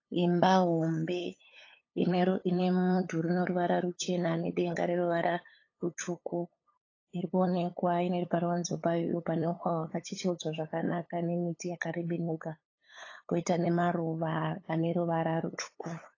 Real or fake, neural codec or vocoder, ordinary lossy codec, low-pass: fake; codec, 16 kHz, 8 kbps, FunCodec, trained on LibriTTS, 25 frames a second; AAC, 32 kbps; 7.2 kHz